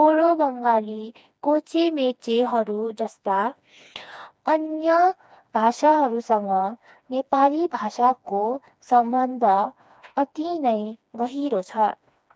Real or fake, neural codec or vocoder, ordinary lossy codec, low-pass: fake; codec, 16 kHz, 2 kbps, FreqCodec, smaller model; none; none